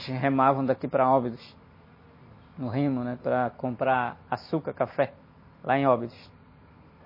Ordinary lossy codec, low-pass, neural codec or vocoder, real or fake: MP3, 24 kbps; 5.4 kHz; none; real